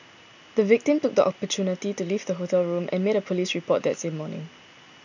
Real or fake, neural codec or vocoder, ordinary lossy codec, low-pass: real; none; none; 7.2 kHz